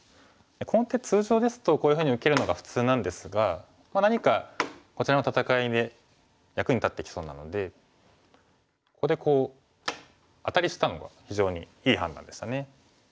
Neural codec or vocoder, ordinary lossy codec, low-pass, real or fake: none; none; none; real